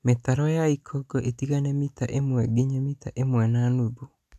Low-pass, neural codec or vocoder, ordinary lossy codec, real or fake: 14.4 kHz; none; none; real